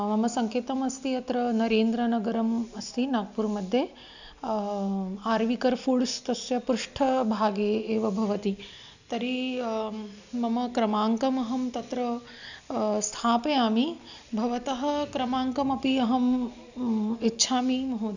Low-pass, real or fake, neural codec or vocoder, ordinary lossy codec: 7.2 kHz; real; none; none